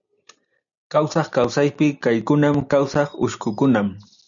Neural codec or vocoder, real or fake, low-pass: none; real; 7.2 kHz